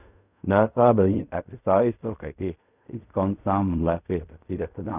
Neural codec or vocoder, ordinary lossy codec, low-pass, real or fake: codec, 16 kHz in and 24 kHz out, 0.4 kbps, LongCat-Audio-Codec, fine tuned four codebook decoder; none; 3.6 kHz; fake